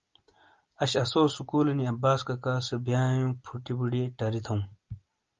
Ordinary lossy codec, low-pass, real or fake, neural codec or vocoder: Opus, 32 kbps; 7.2 kHz; real; none